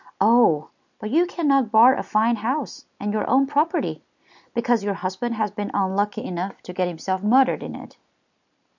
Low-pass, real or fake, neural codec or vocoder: 7.2 kHz; real; none